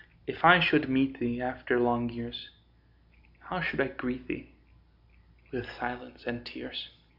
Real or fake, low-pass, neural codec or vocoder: real; 5.4 kHz; none